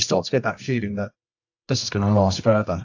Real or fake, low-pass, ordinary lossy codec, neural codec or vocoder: fake; 7.2 kHz; AAC, 48 kbps; codec, 24 kHz, 0.9 kbps, WavTokenizer, medium music audio release